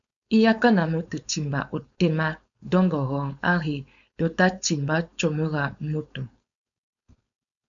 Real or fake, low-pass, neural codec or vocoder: fake; 7.2 kHz; codec, 16 kHz, 4.8 kbps, FACodec